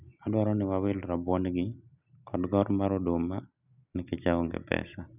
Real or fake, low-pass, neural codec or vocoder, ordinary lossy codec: real; 3.6 kHz; none; none